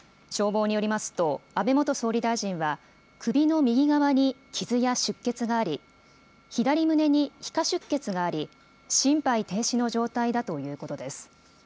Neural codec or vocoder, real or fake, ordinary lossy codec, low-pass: none; real; none; none